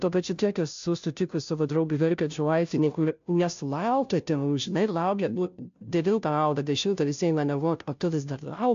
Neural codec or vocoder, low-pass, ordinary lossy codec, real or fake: codec, 16 kHz, 0.5 kbps, FunCodec, trained on Chinese and English, 25 frames a second; 7.2 kHz; AAC, 64 kbps; fake